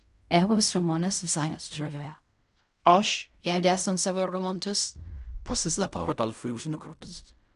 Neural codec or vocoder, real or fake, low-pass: codec, 16 kHz in and 24 kHz out, 0.4 kbps, LongCat-Audio-Codec, fine tuned four codebook decoder; fake; 10.8 kHz